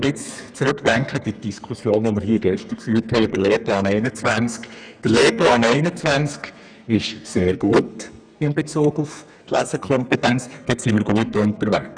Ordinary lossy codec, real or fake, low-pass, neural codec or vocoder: none; fake; 9.9 kHz; codec, 32 kHz, 1.9 kbps, SNAC